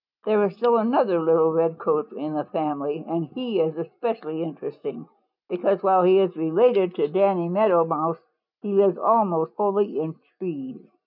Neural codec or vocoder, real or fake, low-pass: none; real; 5.4 kHz